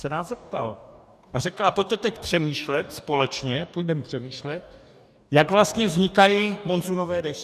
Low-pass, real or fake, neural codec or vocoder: 14.4 kHz; fake; codec, 44.1 kHz, 2.6 kbps, DAC